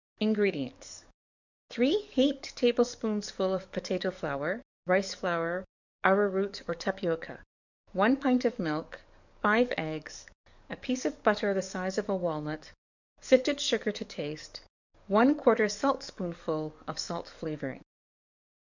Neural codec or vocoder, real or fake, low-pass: codec, 44.1 kHz, 7.8 kbps, DAC; fake; 7.2 kHz